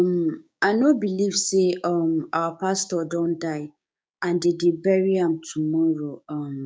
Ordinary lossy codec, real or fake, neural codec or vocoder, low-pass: none; fake; codec, 16 kHz, 6 kbps, DAC; none